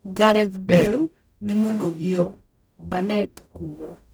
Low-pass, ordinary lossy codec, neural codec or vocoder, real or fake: none; none; codec, 44.1 kHz, 0.9 kbps, DAC; fake